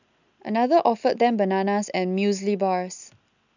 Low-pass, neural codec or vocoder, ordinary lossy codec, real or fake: 7.2 kHz; none; none; real